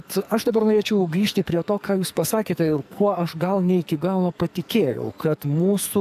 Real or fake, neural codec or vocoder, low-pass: fake; codec, 44.1 kHz, 2.6 kbps, SNAC; 14.4 kHz